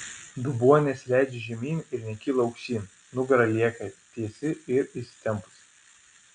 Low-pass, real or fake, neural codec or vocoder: 9.9 kHz; real; none